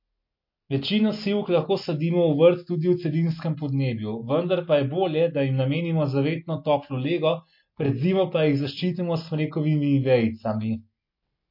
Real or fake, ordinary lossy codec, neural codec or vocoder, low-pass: real; MP3, 32 kbps; none; 5.4 kHz